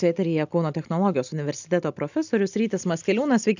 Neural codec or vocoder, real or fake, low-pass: none; real; 7.2 kHz